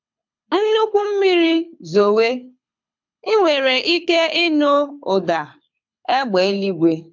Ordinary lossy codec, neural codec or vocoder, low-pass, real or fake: MP3, 64 kbps; codec, 24 kHz, 6 kbps, HILCodec; 7.2 kHz; fake